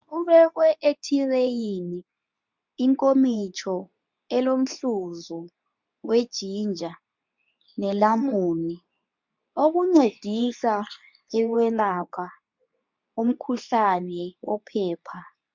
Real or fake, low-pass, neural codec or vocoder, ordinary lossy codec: fake; 7.2 kHz; codec, 24 kHz, 0.9 kbps, WavTokenizer, medium speech release version 2; MP3, 64 kbps